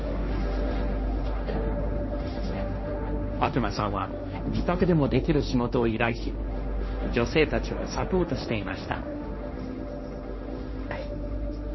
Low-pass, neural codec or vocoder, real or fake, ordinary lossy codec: 7.2 kHz; codec, 16 kHz, 1.1 kbps, Voila-Tokenizer; fake; MP3, 24 kbps